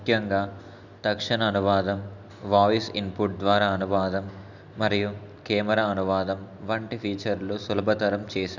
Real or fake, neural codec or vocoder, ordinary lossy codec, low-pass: real; none; none; 7.2 kHz